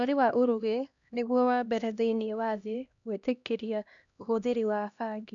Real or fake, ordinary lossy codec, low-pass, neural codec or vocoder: fake; none; 7.2 kHz; codec, 16 kHz, 1 kbps, X-Codec, HuBERT features, trained on LibriSpeech